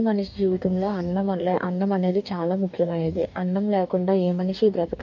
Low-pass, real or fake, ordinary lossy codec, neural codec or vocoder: 7.2 kHz; fake; none; codec, 44.1 kHz, 2.6 kbps, DAC